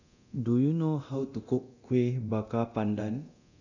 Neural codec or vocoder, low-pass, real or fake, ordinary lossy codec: codec, 24 kHz, 0.9 kbps, DualCodec; 7.2 kHz; fake; none